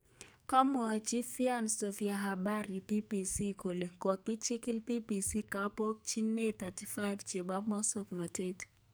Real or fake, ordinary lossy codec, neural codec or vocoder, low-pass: fake; none; codec, 44.1 kHz, 2.6 kbps, SNAC; none